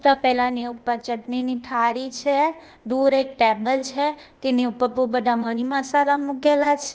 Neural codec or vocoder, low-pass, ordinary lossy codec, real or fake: codec, 16 kHz, 0.8 kbps, ZipCodec; none; none; fake